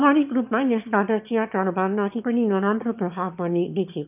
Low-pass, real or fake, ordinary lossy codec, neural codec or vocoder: 3.6 kHz; fake; none; autoencoder, 22.05 kHz, a latent of 192 numbers a frame, VITS, trained on one speaker